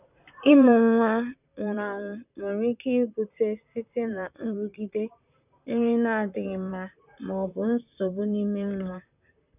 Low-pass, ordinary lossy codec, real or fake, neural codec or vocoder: 3.6 kHz; none; fake; vocoder, 44.1 kHz, 128 mel bands, Pupu-Vocoder